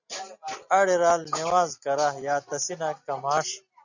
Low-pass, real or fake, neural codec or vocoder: 7.2 kHz; real; none